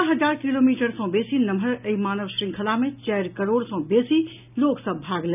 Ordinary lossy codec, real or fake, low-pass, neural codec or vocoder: none; real; 3.6 kHz; none